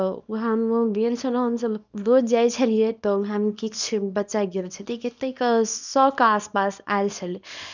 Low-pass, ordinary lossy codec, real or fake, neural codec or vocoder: 7.2 kHz; none; fake; codec, 24 kHz, 0.9 kbps, WavTokenizer, small release